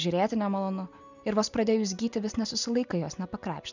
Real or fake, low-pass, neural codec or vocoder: real; 7.2 kHz; none